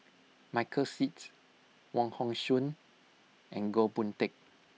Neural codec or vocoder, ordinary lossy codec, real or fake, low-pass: none; none; real; none